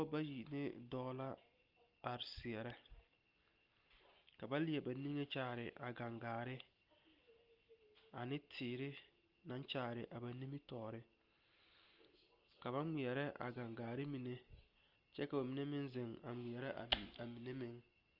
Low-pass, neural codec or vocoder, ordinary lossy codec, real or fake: 5.4 kHz; none; Opus, 24 kbps; real